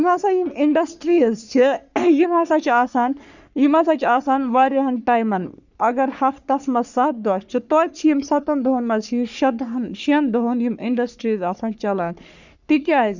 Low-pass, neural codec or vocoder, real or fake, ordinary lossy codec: 7.2 kHz; codec, 44.1 kHz, 3.4 kbps, Pupu-Codec; fake; none